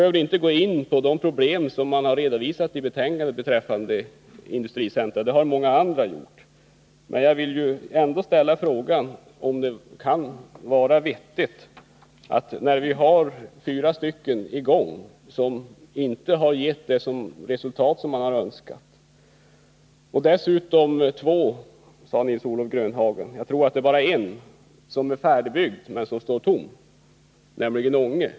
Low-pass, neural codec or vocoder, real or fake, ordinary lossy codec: none; none; real; none